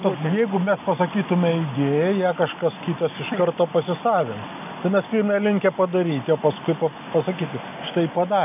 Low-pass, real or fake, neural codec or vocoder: 3.6 kHz; real; none